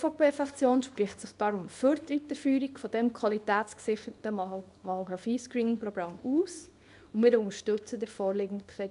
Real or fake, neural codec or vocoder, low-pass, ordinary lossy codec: fake; codec, 24 kHz, 0.9 kbps, WavTokenizer, small release; 10.8 kHz; none